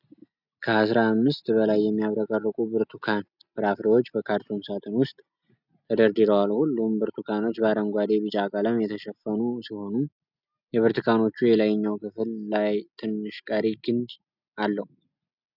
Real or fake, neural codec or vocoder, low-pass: real; none; 5.4 kHz